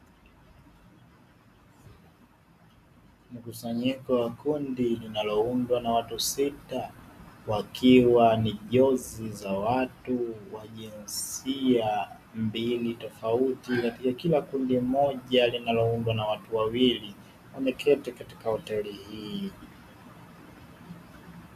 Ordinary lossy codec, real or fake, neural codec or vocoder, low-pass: MP3, 96 kbps; real; none; 14.4 kHz